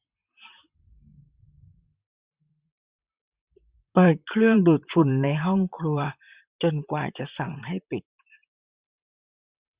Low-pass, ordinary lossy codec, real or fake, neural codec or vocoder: 3.6 kHz; Opus, 64 kbps; fake; vocoder, 44.1 kHz, 128 mel bands, Pupu-Vocoder